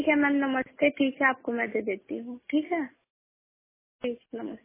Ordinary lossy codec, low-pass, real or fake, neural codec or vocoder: MP3, 16 kbps; 3.6 kHz; real; none